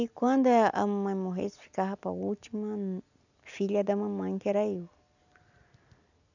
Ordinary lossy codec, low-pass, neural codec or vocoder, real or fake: none; 7.2 kHz; none; real